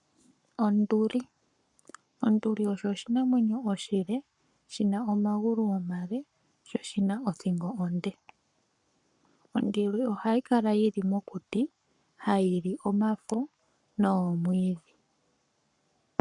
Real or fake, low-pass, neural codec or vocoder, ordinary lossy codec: fake; 10.8 kHz; codec, 44.1 kHz, 7.8 kbps, Pupu-Codec; MP3, 96 kbps